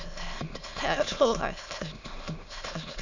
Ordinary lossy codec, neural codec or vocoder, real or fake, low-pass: none; autoencoder, 22.05 kHz, a latent of 192 numbers a frame, VITS, trained on many speakers; fake; 7.2 kHz